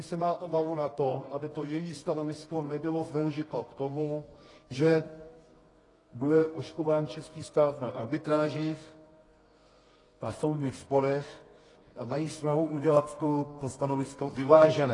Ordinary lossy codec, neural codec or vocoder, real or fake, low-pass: AAC, 32 kbps; codec, 24 kHz, 0.9 kbps, WavTokenizer, medium music audio release; fake; 10.8 kHz